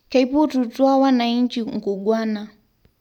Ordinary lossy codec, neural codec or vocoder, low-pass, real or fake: none; none; 19.8 kHz; real